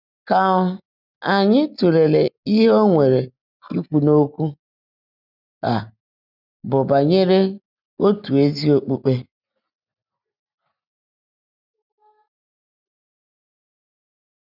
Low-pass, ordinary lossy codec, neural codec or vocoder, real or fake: 5.4 kHz; none; none; real